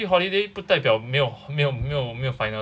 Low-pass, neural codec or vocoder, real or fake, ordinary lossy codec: none; none; real; none